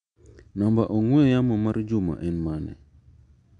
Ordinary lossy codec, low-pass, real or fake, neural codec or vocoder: none; 9.9 kHz; real; none